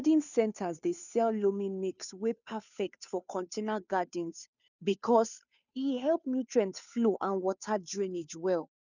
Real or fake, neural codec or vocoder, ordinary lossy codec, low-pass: fake; codec, 16 kHz, 2 kbps, FunCodec, trained on Chinese and English, 25 frames a second; none; 7.2 kHz